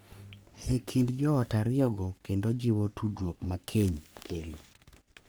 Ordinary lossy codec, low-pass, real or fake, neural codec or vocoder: none; none; fake; codec, 44.1 kHz, 3.4 kbps, Pupu-Codec